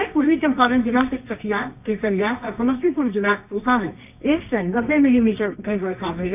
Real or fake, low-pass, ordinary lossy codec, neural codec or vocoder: fake; 3.6 kHz; none; codec, 24 kHz, 0.9 kbps, WavTokenizer, medium music audio release